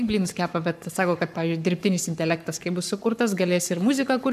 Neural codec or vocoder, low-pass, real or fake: codec, 44.1 kHz, 7.8 kbps, Pupu-Codec; 14.4 kHz; fake